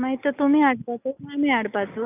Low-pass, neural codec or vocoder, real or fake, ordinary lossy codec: 3.6 kHz; none; real; none